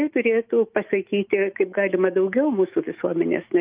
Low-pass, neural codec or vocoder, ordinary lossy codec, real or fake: 3.6 kHz; autoencoder, 48 kHz, 128 numbers a frame, DAC-VAE, trained on Japanese speech; Opus, 32 kbps; fake